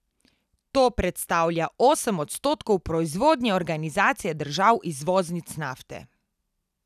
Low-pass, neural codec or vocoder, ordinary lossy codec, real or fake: 14.4 kHz; none; none; real